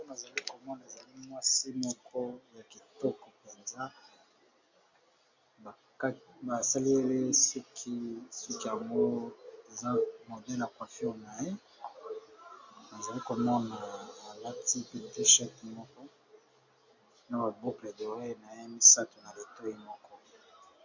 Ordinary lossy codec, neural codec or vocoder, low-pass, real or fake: MP3, 48 kbps; none; 7.2 kHz; real